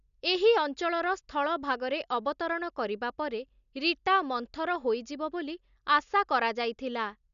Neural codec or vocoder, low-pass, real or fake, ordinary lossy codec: none; 7.2 kHz; real; none